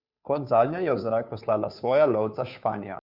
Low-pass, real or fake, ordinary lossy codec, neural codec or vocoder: 5.4 kHz; fake; Opus, 64 kbps; codec, 16 kHz, 2 kbps, FunCodec, trained on Chinese and English, 25 frames a second